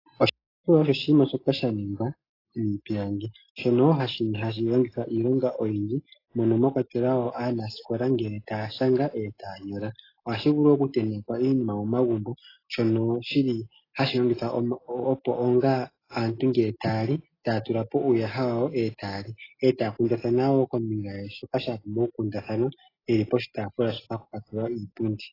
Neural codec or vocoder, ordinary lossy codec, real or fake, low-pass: none; AAC, 24 kbps; real; 5.4 kHz